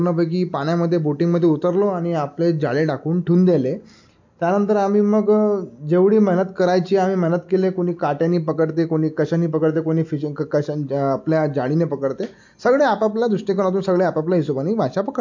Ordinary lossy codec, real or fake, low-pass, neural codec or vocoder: MP3, 48 kbps; real; 7.2 kHz; none